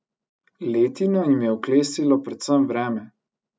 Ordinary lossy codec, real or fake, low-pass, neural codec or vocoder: none; real; none; none